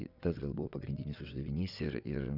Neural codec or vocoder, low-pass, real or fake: vocoder, 22.05 kHz, 80 mel bands, WaveNeXt; 5.4 kHz; fake